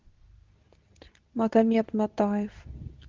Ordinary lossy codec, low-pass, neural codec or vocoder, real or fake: Opus, 32 kbps; 7.2 kHz; codec, 24 kHz, 0.9 kbps, WavTokenizer, medium speech release version 2; fake